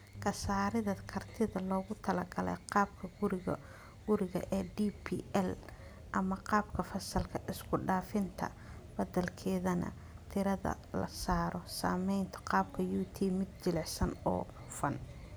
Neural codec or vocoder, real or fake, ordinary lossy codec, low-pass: none; real; none; none